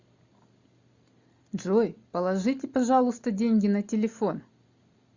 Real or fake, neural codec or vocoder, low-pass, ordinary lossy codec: real; none; 7.2 kHz; Opus, 64 kbps